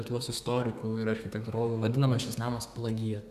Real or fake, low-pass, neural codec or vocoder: fake; 14.4 kHz; codec, 32 kHz, 1.9 kbps, SNAC